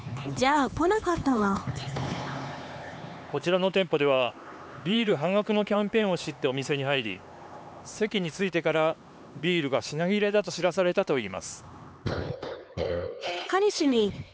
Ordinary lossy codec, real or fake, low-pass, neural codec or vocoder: none; fake; none; codec, 16 kHz, 4 kbps, X-Codec, HuBERT features, trained on LibriSpeech